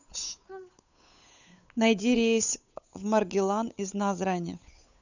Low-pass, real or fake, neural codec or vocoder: 7.2 kHz; fake; codec, 16 kHz, 8 kbps, FunCodec, trained on Chinese and English, 25 frames a second